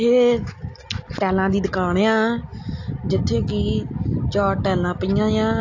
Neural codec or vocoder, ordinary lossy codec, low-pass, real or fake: none; AAC, 48 kbps; 7.2 kHz; real